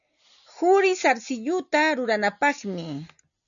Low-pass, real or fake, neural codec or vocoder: 7.2 kHz; real; none